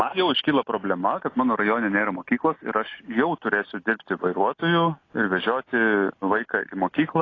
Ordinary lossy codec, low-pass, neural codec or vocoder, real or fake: AAC, 32 kbps; 7.2 kHz; none; real